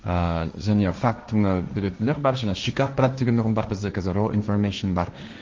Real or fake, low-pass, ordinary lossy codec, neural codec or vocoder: fake; 7.2 kHz; Opus, 32 kbps; codec, 16 kHz, 1.1 kbps, Voila-Tokenizer